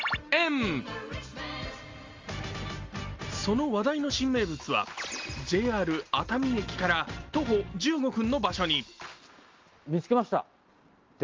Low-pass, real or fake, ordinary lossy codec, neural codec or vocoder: 7.2 kHz; real; Opus, 32 kbps; none